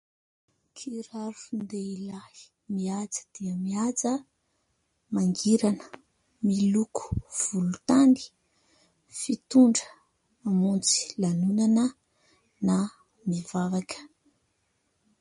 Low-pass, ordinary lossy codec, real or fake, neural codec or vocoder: 10.8 kHz; MP3, 48 kbps; real; none